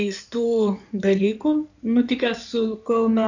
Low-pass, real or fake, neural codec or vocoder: 7.2 kHz; fake; codec, 16 kHz in and 24 kHz out, 2.2 kbps, FireRedTTS-2 codec